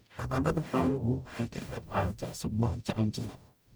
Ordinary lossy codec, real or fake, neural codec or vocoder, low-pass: none; fake; codec, 44.1 kHz, 0.9 kbps, DAC; none